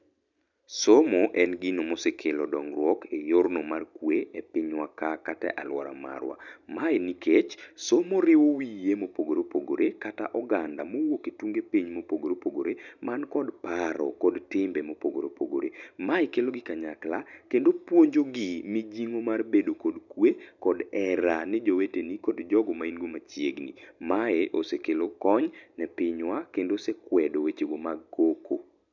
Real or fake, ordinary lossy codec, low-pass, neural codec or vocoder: real; none; 7.2 kHz; none